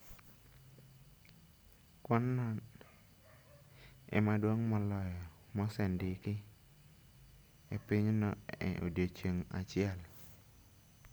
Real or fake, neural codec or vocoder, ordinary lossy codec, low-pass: real; none; none; none